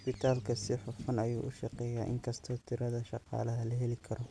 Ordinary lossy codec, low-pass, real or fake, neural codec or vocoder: none; none; real; none